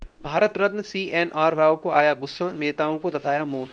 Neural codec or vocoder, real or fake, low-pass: codec, 24 kHz, 0.9 kbps, WavTokenizer, medium speech release version 2; fake; 9.9 kHz